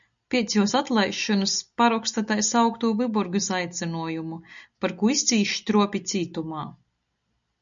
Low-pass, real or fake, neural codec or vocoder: 7.2 kHz; real; none